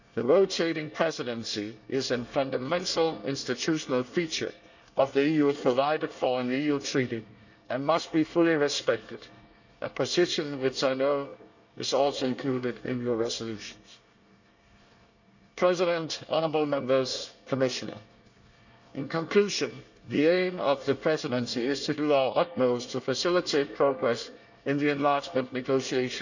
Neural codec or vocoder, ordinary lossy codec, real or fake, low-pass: codec, 24 kHz, 1 kbps, SNAC; none; fake; 7.2 kHz